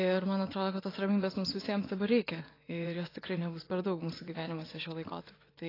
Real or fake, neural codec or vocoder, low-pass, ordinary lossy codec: fake; vocoder, 24 kHz, 100 mel bands, Vocos; 5.4 kHz; AAC, 24 kbps